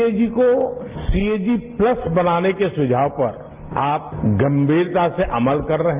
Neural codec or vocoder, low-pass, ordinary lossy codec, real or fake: none; 3.6 kHz; Opus, 24 kbps; real